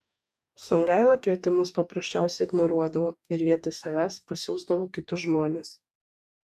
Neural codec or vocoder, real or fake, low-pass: codec, 44.1 kHz, 2.6 kbps, DAC; fake; 14.4 kHz